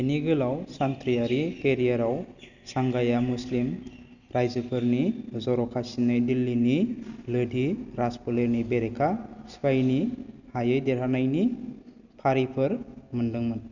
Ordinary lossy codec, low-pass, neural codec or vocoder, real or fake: none; 7.2 kHz; none; real